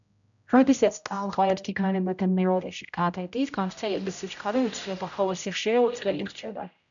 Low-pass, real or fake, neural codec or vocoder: 7.2 kHz; fake; codec, 16 kHz, 0.5 kbps, X-Codec, HuBERT features, trained on general audio